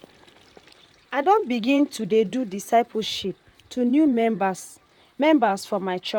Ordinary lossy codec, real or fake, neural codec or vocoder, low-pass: none; fake; vocoder, 44.1 kHz, 128 mel bands, Pupu-Vocoder; 19.8 kHz